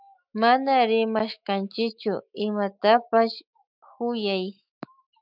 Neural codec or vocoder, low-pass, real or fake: autoencoder, 48 kHz, 128 numbers a frame, DAC-VAE, trained on Japanese speech; 5.4 kHz; fake